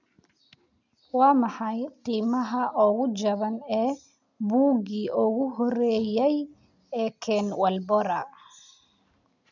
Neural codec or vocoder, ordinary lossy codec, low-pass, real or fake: none; none; 7.2 kHz; real